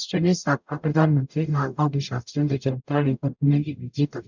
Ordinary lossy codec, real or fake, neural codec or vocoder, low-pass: none; fake; codec, 44.1 kHz, 0.9 kbps, DAC; 7.2 kHz